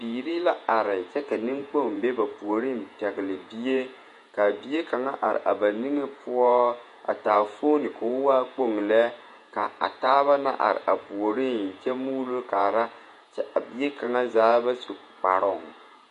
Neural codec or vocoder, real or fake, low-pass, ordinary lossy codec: vocoder, 48 kHz, 128 mel bands, Vocos; fake; 14.4 kHz; MP3, 48 kbps